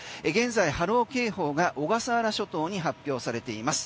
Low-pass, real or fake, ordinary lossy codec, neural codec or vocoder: none; real; none; none